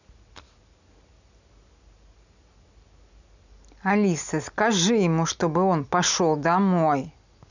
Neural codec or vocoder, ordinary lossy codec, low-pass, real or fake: none; none; 7.2 kHz; real